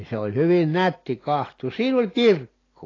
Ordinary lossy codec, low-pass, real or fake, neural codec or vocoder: AAC, 32 kbps; 7.2 kHz; real; none